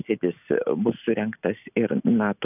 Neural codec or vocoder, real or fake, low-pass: none; real; 3.6 kHz